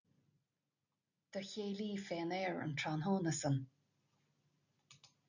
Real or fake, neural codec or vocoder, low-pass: real; none; 7.2 kHz